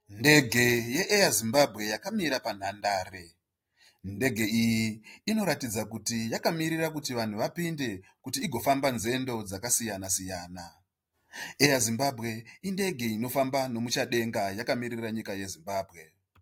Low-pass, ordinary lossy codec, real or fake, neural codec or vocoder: 19.8 kHz; AAC, 48 kbps; real; none